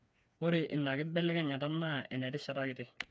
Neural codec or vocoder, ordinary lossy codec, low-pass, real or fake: codec, 16 kHz, 4 kbps, FreqCodec, smaller model; none; none; fake